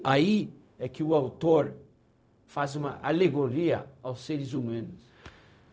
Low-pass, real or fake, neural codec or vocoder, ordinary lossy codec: none; fake; codec, 16 kHz, 0.4 kbps, LongCat-Audio-Codec; none